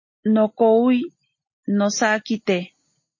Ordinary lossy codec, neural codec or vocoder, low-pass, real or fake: MP3, 32 kbps; none; 7.2 kHz; real